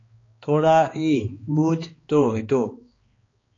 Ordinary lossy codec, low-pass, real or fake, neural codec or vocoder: AAC, 32 kbps; 7.2 kHz; fake; codec, 16 kHz, 2 kbps, X-Codec, HuBERT features, trained on balanced general audio